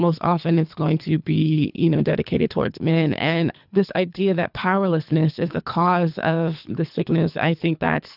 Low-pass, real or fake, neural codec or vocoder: 5.4 kHz; fake; codec, 24 kHz, 3 kbps, HILCodec